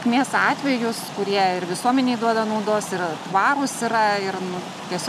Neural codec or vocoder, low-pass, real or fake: none; 14.4 kHz; real